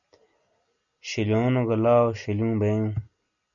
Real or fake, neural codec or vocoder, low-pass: real; none; 7.2 kHz